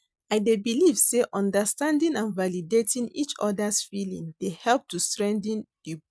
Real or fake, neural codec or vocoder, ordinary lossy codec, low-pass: real; none; none; none